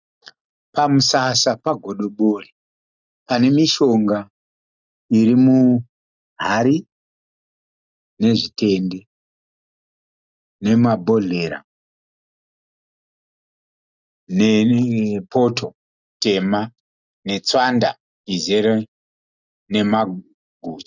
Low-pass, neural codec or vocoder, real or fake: 7.2 kHz; none; real